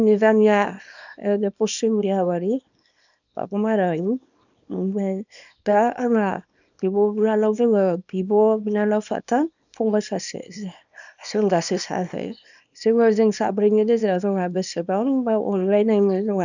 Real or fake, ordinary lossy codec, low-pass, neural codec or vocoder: fake; none; 7.2 kHz; codec, 24 kHz, 0.9 kbps, WavTokenizer, small release